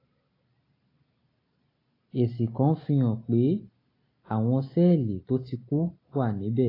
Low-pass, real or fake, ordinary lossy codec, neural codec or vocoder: 5.4 kHz; real; AAC, 24 kbps; none